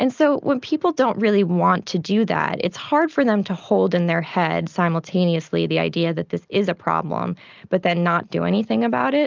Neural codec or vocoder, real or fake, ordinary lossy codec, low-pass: none; real; Opus, 24 kbps; 7.2 kHz